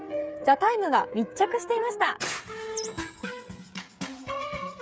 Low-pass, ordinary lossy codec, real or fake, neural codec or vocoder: none; none; fake; codec, 16 kHz, 8 kbps, FreqCodec, smaller model